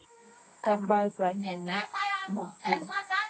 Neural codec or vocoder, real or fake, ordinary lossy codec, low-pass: codec, 24 kHz, 0.9 kbps, WavTokenizer, medium music audio release; fake; AAC, 32 kbps; 9.9 kHz